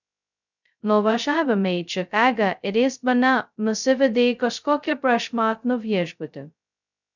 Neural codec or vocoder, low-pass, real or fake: codec, 16 kHz, 0.2 kbps, FocalCodec; 7.2 kHz; fake